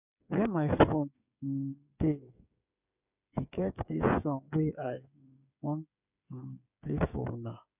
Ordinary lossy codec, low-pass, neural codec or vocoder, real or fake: none; 3.6 kHz; codec, 16 kHz, 8 kbps, FreqCodec, smaller model; fake